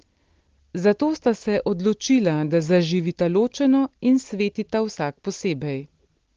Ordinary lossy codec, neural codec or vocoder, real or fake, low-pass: Opus, 16 kbps; none; real; 7.2 kHz